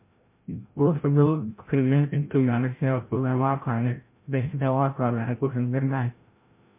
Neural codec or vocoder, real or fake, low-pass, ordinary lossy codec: codec, 16 kHz, 0.5 kbps, FreqCodec, larger model; fake; 3.6 kHz; MP3, 24 kbps